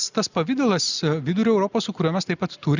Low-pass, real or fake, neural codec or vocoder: 7.2 kHz; real; none